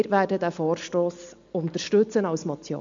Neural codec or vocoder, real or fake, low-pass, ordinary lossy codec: none; real; 7.2 kHz; MP3, 64 kbps